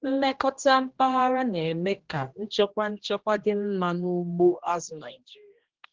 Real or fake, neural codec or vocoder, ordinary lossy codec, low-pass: fake; codec, 16 kHz, 1 kbps, X-Codec, HuBERT features, trained on general audio; Opus, 16 kbps; 7.2 kHz